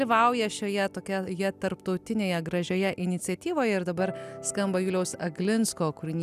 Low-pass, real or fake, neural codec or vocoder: 14.4 kHz; real; none